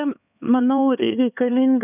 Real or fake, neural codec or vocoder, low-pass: fake; codec, 16 kHz, 4 kbps, X-Codec, HuBERT features, trained on LibriSpeech; 3.6 kHz